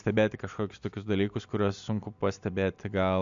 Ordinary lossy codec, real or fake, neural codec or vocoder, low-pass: MP3, 64 kbps; real; none; 7.2 kHz